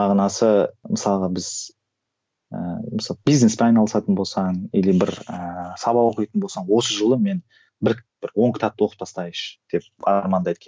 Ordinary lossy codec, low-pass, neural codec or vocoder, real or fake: none; none; none; real